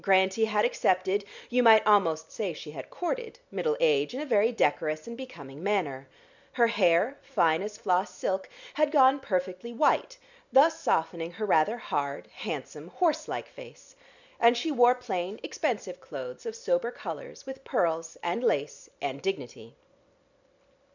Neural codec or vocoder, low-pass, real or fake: none; 7.2 kHz; real